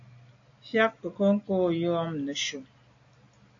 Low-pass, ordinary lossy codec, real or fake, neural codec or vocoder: 7.2 kHz; AAC, 48 kbps; real; none